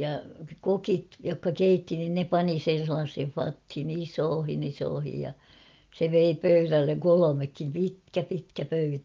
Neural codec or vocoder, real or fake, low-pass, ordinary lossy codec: none; real; 7.2 kHz; Opus, 24 kbps